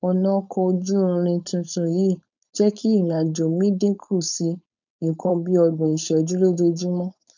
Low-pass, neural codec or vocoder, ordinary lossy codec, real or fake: 7.2 kHz; codec, 16 kHz, 4.8 kbps, FACodec; none; fake